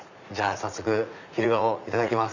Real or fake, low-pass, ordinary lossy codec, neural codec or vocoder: fake; 7.2 kHz; none; vocoder, 44.1 kHz, 80 mel bands, Vocos